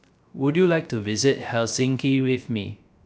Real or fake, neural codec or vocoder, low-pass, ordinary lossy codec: fake; codec, 16 kHz, 0.3 kbps, FocalCodec; none; none